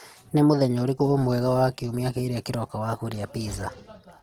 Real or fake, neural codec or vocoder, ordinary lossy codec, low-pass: real; none; Opus, 16 kbps; 19.8 kHz